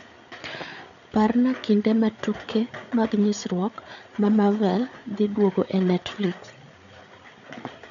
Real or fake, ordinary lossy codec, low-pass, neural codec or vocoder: fake; none; 7.2 kHz; codec, 16 kHz, 8 kbps, FreqCodec, larger model